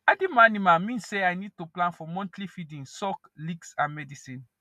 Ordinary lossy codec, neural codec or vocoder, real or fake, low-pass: none; none; real; 14.4 kHz